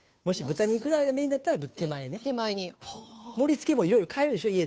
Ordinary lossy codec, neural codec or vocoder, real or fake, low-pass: none; codec, 16 kHz, 2 kbps, FunCodec, trained on Chinese and English, 25 frames a second; fake; none